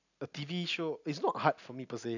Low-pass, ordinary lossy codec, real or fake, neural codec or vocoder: 7.2 kHz; none; real; none